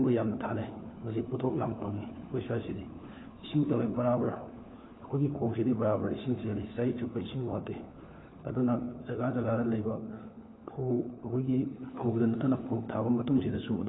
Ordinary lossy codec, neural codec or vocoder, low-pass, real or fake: AAC, 16 kbps; codec, 16 kHz, 4 kbps, FunCodec, trained on LibriTTS, 50 frames a second; 7.2 kHz; fake